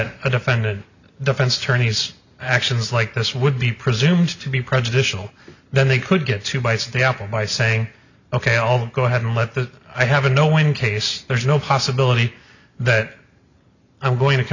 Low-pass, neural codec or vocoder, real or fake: 7.2 kHz; none; real